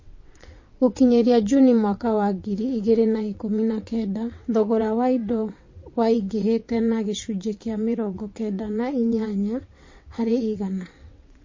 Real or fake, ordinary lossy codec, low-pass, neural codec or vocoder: fake; MP3, 32 kbps; 7.2 kHz; vocoder, 22.05 kHz, 80 mel bands, WaveNeXt